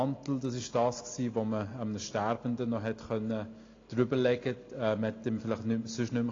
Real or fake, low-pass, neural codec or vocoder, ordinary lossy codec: real; 7.2 kHz; none; AAC, 32 kbps